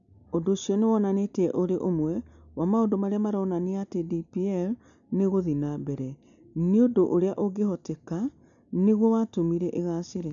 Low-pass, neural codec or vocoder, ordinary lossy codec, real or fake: 7.2 kHz; none; none; real